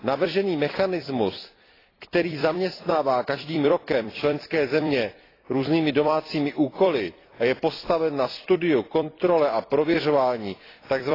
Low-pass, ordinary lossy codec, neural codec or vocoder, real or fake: 5.4 kHz; AAC, 24 kbps; vocoder, 44.1 kHz, 128 mel bands every 256 samples, BigVGAN v2; fake